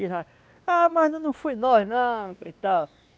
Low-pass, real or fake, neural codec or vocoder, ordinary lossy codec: none; fake; codec, 16 kHz, 2 kbps, X-Codec, WavLM features, trained on Multilingual LibriSpeech; none